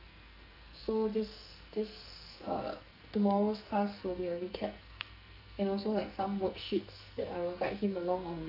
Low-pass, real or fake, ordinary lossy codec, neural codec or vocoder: 5.4 kHz; fake; none; codec, 44.1 kHz, 2.6 kbps, SNAC